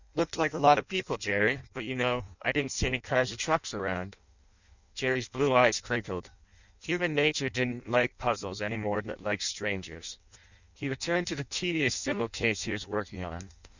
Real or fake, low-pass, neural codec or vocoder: fake; 7.2 kHz; codec, 16 kHz in and 24 kHz out, 0.6 kbps, FireRedTTS-2 codec